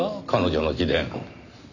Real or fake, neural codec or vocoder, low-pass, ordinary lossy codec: real; none; 7.2 kHz; none